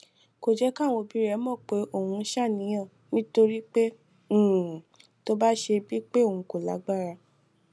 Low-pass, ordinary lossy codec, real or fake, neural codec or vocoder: none; none; real; none